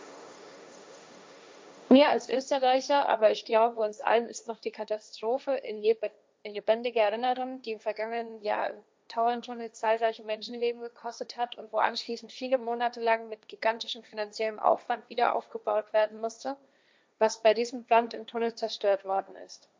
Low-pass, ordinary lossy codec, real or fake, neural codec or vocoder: none; none; fake; codec, 16 kHz, 1.1 kbps, Voila-Tokenizer